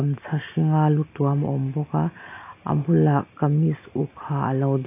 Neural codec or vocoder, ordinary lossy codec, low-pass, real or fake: none; AAC, 24 kbps; 3.6 kHz; real